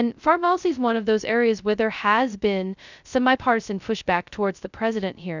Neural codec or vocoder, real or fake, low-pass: codec, 16 kHz, 0.2 kbps, FocalCodec; fake; 7.2 kHz